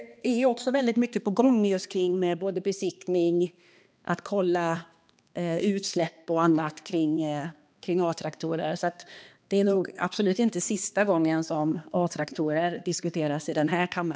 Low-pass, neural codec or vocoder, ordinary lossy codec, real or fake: none; codec, 16 kHz, 2 kbps, X-Codec, HuBERT features, trained on balanced general audio; none; fake